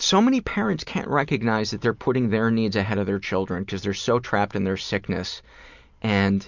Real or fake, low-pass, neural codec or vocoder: real; 7.2 kHz; none